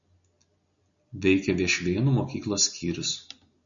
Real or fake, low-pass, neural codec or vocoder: real; 7.2 kHz; none